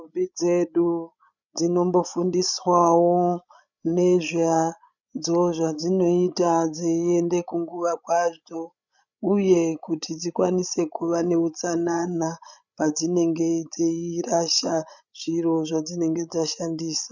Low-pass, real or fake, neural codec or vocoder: 7.2 kHz; fake; codec, 16 kHz, 16 kbps, FreqCodec, larger model